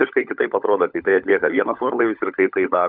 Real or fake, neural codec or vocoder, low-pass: fake; codec, 16 kHz, 16 kbps, FunCodec, trained on LibriTTS, 50 frames a second; 5.4 kHz